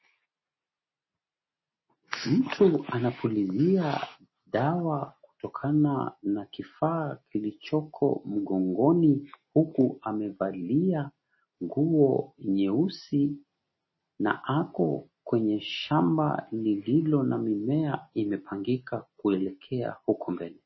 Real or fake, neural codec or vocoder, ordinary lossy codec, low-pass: real; none; MP3, 24 kbps; 7.2 kHz